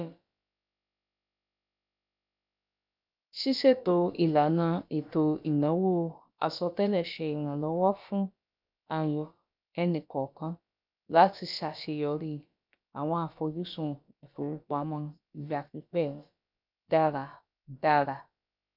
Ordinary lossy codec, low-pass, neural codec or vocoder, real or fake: none; 5.4 kHz; codec, 16 kHz, about 1 kbps, DyCAST, with the encoder's durations; fake